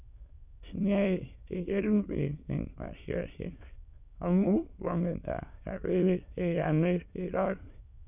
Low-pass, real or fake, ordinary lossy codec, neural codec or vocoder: 3.6 kHz; fake; none; autoencoder, 22.05 kHz, a latent of 192 numbers a frame, VITS, trained on many speakers